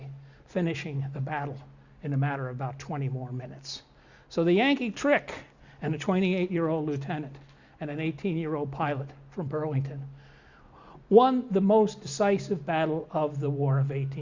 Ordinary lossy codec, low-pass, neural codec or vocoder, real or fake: Opus, 64 kbps; 7.2 kHz; none; real